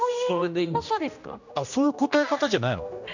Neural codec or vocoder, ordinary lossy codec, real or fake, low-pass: codec, 16 kHz, 1 kbps, X-Codec, HuBERT features, trained on general audio; none; fake; 7.2 kHz